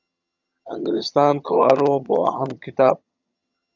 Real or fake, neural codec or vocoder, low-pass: fake; vocoder, 22.05 kHz, 80 mel bands, HiFi-GAN; 7.2 kHz